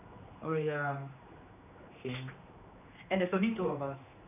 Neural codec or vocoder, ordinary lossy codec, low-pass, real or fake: codec, 16 kHz, 2 kbps, X-Codec, HuBERT features, trained on general audio; none; 3.6 kHz; fake